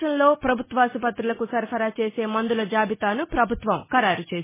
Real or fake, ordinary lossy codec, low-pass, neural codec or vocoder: real; MP3, 16 kbps; 3.6 kHz; none